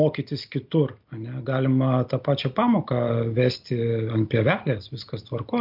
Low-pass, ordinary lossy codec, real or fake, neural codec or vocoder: 5.4 kHz; MP3, 48 kbps; real; none